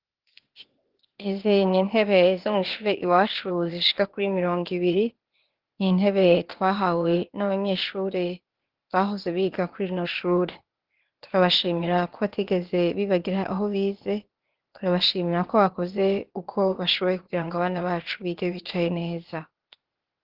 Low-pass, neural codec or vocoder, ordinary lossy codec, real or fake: 5.4 kHz; codec, 16 kHz, 0.8 kbps, ZipCodec; Opus, 16 kbps; fake